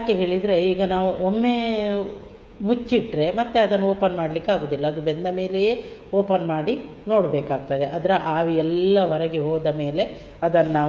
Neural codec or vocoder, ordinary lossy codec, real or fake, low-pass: codec, 16 kHz, 8 kbps, FreqCodec, smaller model; none; fake; none